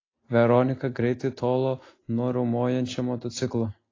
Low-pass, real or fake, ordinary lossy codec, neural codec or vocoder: 7.2 kHz; real; AAC, 32 kbps; none